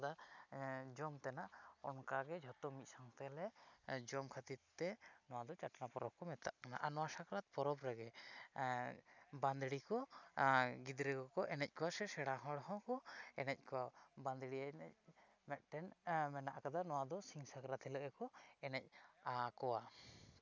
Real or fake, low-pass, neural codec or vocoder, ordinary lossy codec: real; 7.2 kHz; none; none